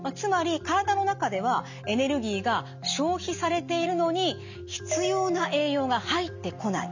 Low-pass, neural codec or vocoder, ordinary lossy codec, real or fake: 7.2 kHz; none; none; real